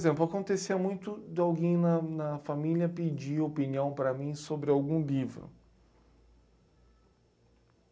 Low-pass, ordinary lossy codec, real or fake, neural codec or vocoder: none; none; real; none